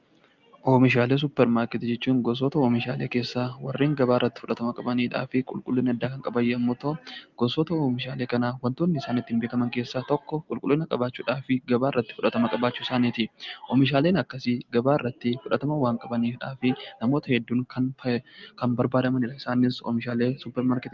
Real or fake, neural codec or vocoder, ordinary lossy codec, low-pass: real; none; Opus, 24 kbps; 7.2 kHz